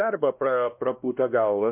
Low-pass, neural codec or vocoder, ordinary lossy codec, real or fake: 3.6 kHz; codec, 16 kHz, 1 kbps, X-Codec, WavLM features, trained on Multilingual LibriSpeech; MP3, 32 kbps; fake